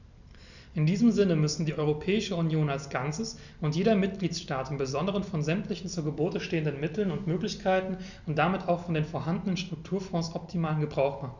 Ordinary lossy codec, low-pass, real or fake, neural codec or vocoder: none; 7.2 kHz; real; none